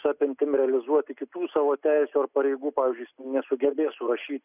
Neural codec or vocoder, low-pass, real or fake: none; 3.6 kHz; real